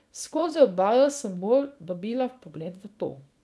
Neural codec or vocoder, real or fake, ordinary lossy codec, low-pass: codec, 24 kHz, 0.9 kbps, WavTokenizer, small release; fake; none; none